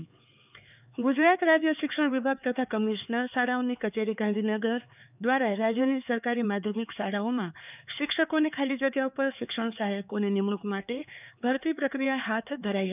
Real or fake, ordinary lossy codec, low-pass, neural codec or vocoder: fake; none; 3.6 kHz; codec, 16 kHz, 4 kbps, X-Codec, HuBERT features, trained on LibriSpeech